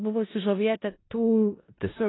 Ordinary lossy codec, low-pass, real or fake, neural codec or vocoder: AAC, 16 kbps; 7.2 kHz; fake; codec, 16 kHz in and 24 kHz out, 0.4 kbps, LongCat-Audio-Codec, four codebook decoder